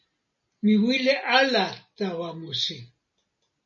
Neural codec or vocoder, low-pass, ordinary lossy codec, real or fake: none; 7.2 kHz; MP3, 32 kbps; real